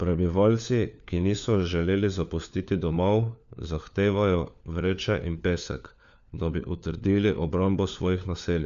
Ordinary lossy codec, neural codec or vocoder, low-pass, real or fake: none; codec, 16 kHz, 4 kbps, FunCodec, trained on LibriTTS, 50 frames a second; 7.2 kHz; fake